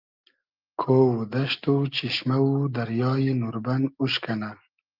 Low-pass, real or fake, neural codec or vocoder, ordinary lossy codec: 5.4 kHz; real; none; Opus, 24 kbps